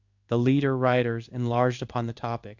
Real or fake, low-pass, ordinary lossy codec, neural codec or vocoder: fake; 7.2 kHz; Opus, 64 kbps; codec, 16 kHz in and 24 kHz out, 1 kbps, XY-Tokenizer